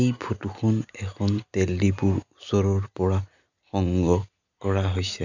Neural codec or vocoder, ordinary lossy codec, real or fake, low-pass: none; none; real; 7.2 kHz